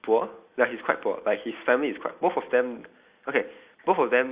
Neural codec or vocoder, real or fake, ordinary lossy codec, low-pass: none; real; Opus, 64 kbps; 3.6 kHz